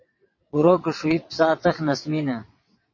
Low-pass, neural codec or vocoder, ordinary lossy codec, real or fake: 7.2 kHz; vocoder, 22.05 kHz, 80 mel bands, WaveNeXt; MP3, 32 kbps; fake